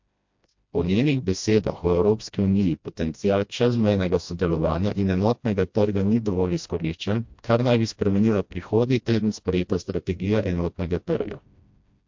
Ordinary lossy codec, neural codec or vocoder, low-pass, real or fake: MP3, 48 kbps; codec, 16 kHz, 1 kbps, FreqCodec, smaller model; 7.2 kHz; fake